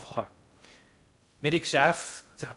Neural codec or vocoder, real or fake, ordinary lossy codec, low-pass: codec, 16 kHz in and 24 kHz out, 0.6 kbps, FocalCodec, streaming, 2048 codes; fake; AAC, 64 kbps; 10.8 kHz